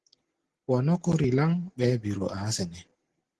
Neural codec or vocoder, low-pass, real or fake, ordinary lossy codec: none; 10.8 kHz; real; Opus, 16 kbps